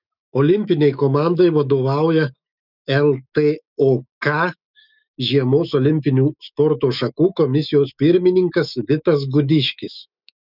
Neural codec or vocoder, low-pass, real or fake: autoencoder, 48 kHz, 128 numbers a frame, DAC-VAE, trained on Japanese speech; 5.4 kHz; fake